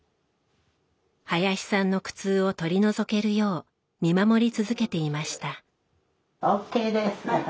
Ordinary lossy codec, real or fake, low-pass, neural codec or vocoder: none; real; none; none